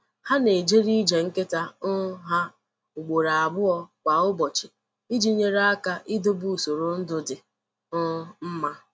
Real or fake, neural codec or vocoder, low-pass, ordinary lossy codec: real; none; none; none